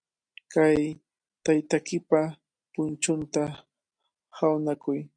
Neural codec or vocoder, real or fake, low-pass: none; real; 9.9 kHz